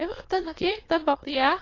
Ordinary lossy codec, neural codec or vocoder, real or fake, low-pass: AAC, 32 kbps; autoencoder, 22.05 kHz, a latent of 192 numbers a frame, VITS, trained on many speakers; fake; 7.2 kHz